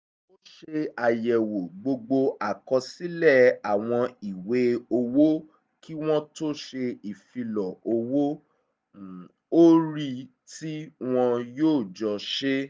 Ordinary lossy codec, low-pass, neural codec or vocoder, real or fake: none; none; none; real